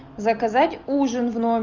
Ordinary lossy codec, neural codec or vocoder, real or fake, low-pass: Opus, 32 kbps; none; real; 7.2 kHz